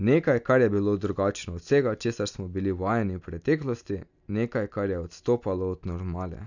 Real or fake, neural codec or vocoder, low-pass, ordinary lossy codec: real; none; 7.2 kHz; none